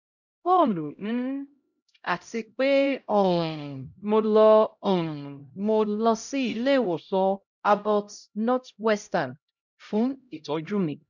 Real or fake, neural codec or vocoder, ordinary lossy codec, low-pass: fake; codec, 16 kHz, 0.5 kbps, X-Codec, HuBERT features, trained on LibriSpeech; none; 7.2 kHz